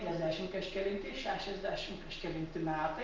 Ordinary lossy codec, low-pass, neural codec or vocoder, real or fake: Opus, 32 kbps; 7.2 kHz; none; real